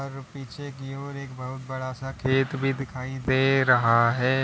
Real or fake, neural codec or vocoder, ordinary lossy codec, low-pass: real; none; none; none